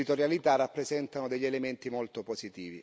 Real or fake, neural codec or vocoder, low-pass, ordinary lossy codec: real; none; none; none